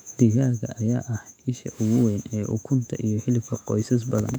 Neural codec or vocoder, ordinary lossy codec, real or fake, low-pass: autoencoder, 48 kHz, 128 numbers a frame, DAC-VAE, trained on Japanese speech; none; fake; 19.8 kHz